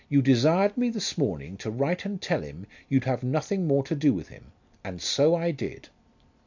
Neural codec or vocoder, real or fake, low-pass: none; real; 7.2 kHz